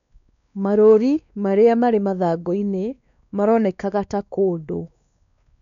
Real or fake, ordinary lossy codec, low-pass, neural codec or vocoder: fake; none; 7.2 kHz; codec, 16 kHz, 2 kbps, X-Codec, WavLM features, trained on Multilingual LibriSpeech